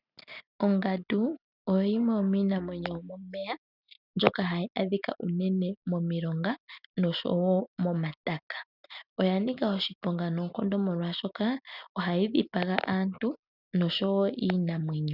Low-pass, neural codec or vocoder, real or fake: 5.4 kHz; none; real